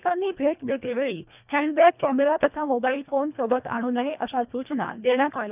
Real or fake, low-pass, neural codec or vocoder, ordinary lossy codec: fake; 3.6 kHz; codec, 24 kHz, 1.5 kbps, HILCodec; none